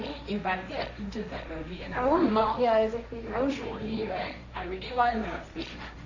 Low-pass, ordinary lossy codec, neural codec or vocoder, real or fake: 7.2 kHz; none; codec, 16 kHz, 1.1 kbps, Voila-Tokenizer; fake